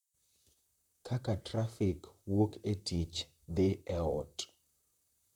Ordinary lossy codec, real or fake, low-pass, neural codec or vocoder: none; fake; 19.8 kHz; vocoder, 44.1 kHz, 128 mel bands, Pupu-Vocoder